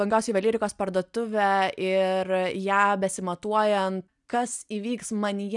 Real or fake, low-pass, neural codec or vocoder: real; 10.8 kHz; none